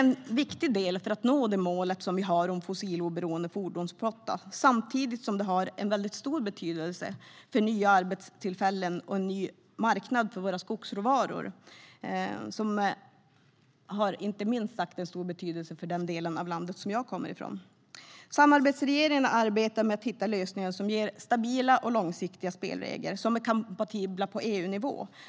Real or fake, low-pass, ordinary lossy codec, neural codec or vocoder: real; none; none; none